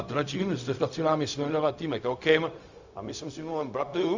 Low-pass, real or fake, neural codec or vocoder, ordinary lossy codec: 7.2 kHz; fake; codec, 16 kHz, 0.4 kbps, LongCat-Audio-Codec; Opus, 64 kbps